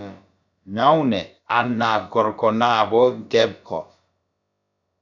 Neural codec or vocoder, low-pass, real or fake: codec, 16 kHz, about 1 kbps, DyCAST, with the encoder's durations; 7.2 kHz; fake